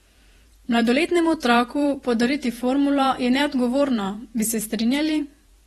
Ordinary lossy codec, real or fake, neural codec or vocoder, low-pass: AAC, 32 kbps; fake; vocoder, 48 kHz, 128 mel bands, Vocos; 19.8 kHz